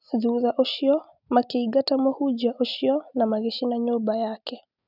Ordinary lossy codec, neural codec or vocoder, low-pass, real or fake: none; none; 5.4 kHz; real